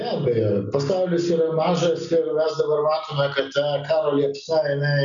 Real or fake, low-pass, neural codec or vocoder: real; 7.2 kHz; none